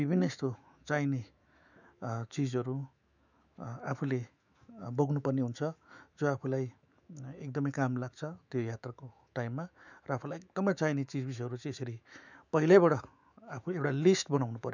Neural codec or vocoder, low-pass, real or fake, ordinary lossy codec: autoencoder, 48 kHz, 128 numbers a frame, DAC-VAE, trained on Japanese speech; 7.2 kHz; fake; none